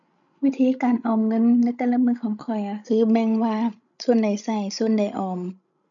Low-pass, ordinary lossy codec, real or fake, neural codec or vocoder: 7.2 kHz; none; fake; codec, 16 kHz, 16 kbps, FreqCodec, larger model